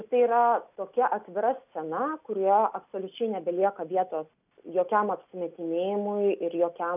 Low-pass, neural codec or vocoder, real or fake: 3.6 kHz; none; real